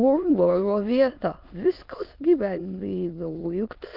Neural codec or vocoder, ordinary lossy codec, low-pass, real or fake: autoencoder, 22.05 kHz, a latent of 192 numbers a frame, VITS, trained on many speakers; Opus, 32 kbps; 5.4 kHz; fake